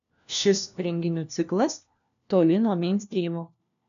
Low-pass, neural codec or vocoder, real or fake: 7.2 kHz; codec, 16 kHz, 1 kbps, FunCodec, trained on LibriTTS, 50 frames a second; fake